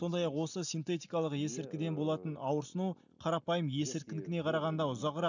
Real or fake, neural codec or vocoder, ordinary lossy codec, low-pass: real; none; none; 7.2 kHz